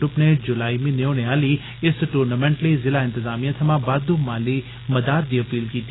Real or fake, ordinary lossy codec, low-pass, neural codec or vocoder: real; AAC, 16 kbps; 7.2 kHz; none